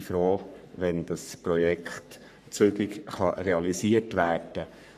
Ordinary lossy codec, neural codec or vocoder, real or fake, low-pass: none; codec, 44.1 kHz, 3.4 kbps, Pupu-Codec; fake; 14.4 kHz